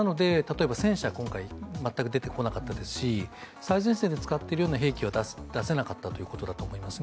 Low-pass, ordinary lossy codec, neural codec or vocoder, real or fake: none; none; none; real